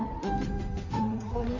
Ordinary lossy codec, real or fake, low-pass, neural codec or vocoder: MP3, 64 kbps; fake; 7.2 kHz; codec, 16 kHz in and 24 kHz out, 2.2 kbps, FireRedTTS-2 codec